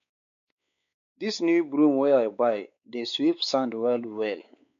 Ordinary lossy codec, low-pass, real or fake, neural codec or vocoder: MP3, 96 kbps; 7.2 kHz; fake; codec, 16 kHz, 4 kbps, X-Codec, WavLM features, trained on Multilingual LibriSpeech